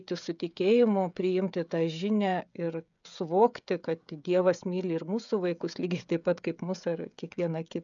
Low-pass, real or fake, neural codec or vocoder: 7.2 kHz; fake; codec, 16 kHz, 16 kbps, FreqCodec, smaller model